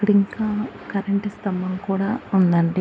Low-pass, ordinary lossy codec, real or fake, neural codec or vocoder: none; none; real; none